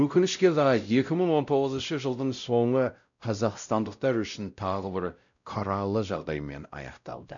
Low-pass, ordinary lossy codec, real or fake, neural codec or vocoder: 7.2 kHz; none; fake; codec, 16 kHz, 0.5 kbps, X-Codec, WavLM features, trained on Multilingual LibriSpeech